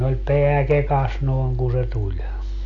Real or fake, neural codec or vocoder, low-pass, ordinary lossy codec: real; none; 7.2 kHz; none